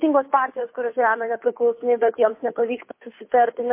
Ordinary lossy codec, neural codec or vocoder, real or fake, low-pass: MP3, 24 kbps; codec, 16 kHz, 2 kbps, FunCodec, trained on Chinese and English, 25 frames a second; fake; 3.6 kHz